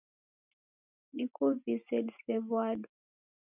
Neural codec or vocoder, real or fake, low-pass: none; real; 3.6 kHz